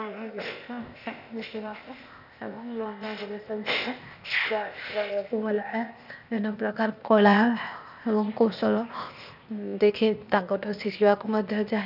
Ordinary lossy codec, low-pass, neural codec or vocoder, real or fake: none; 5.4 kHz; codec, 16 kHz, 0.8 kbps, ZipCodec; fake